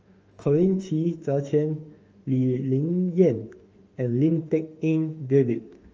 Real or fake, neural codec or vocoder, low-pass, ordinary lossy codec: fake; autoencoder, 48 kHz, 32 numbers a frame, DAC-VAE, trained on Japanese speech; 7.2 kHz; Opus, 24 kbps